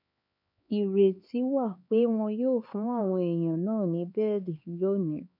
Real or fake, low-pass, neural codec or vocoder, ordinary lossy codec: fake; 5.4 kHz; codec, 16 kHz, 4 kbps, X-Codec, HuBERT features, trained on LibriSpeech; none